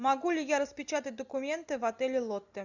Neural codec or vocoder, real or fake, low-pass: none; real; 7.2 kHz